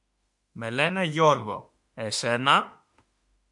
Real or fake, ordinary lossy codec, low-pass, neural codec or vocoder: fake; MP3, 64 kbps; 10.8 kHz; autoencoder, 48 kHz, 32 numbers a frame, DAC-VAE, trained on Japanese speech